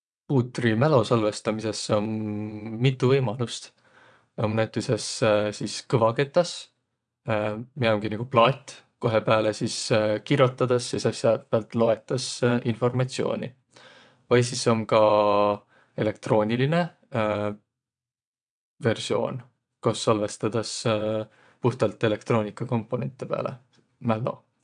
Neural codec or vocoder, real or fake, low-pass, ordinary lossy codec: vocoder, 22.05 kHz, 80 mel bands, WaveNeXt; fake; 9.9 kHz; none